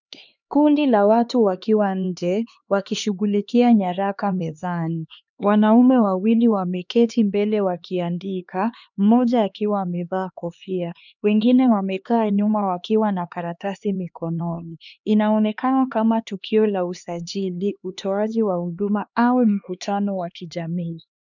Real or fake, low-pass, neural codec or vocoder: fake; 7.2 kHz; codec, 16 kHz, 2 kbps, X-Codec, HuBERT features, trained on LibriSpeech